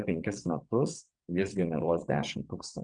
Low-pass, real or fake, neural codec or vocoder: 9.9 kHz; fake; vocoder, 22.05 kHz, 80 mel bands, WaveNeXt